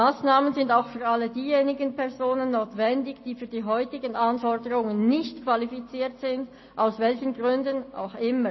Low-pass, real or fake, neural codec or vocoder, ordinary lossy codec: 7.2 kHz; real; none; MP3, 24 kbps